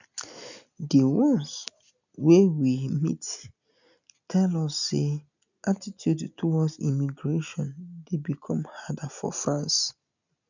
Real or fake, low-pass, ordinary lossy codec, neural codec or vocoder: real; 7.2 kHz; none; none